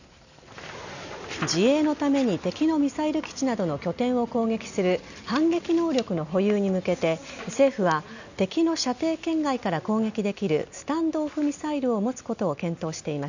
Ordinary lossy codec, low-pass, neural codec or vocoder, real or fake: none; 7.2 kHz; none; real